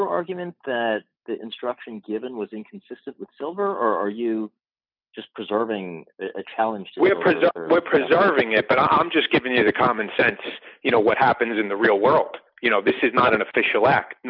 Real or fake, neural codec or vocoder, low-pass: real; none; 5.4 kHz